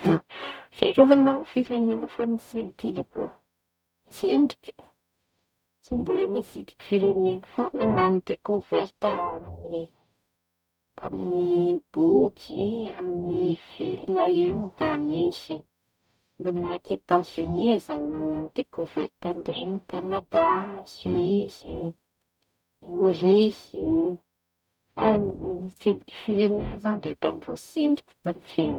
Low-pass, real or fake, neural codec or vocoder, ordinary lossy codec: 19.8 kHz; fake; codec, 44.1 kHz, 0.9 kbps, DAC; none